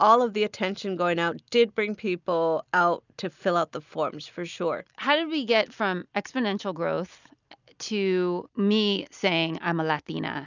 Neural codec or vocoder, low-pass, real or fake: none; 7.2 kHz; real